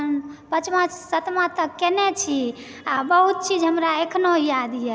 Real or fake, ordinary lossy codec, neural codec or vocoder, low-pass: real; none; none; none